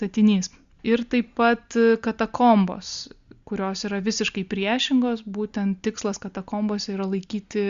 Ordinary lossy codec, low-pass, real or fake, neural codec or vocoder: Opus, 64 kbps; 7.2 kHz; real; none